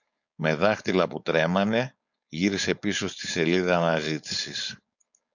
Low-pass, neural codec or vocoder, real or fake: 7.2 kHz; codec, 16 kHz, 4.8 kbps, FACodec; fake